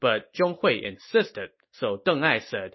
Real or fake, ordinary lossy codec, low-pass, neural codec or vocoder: real; MP3, 24 kbps; 7.2 kHz; none